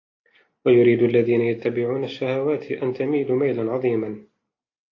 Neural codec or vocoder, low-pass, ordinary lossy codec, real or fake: none; 7.2 kHz; AAC, 32 kbps; real